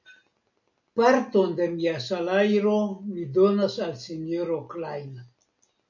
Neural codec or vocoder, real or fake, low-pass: none; real; 7.2 kHz